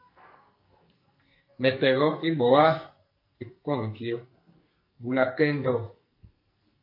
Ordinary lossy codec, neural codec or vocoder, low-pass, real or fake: MP3, 32 kbps; codec, 44.1 kHz, 2.6 kbps, SNAC; 5.4 kHz; fake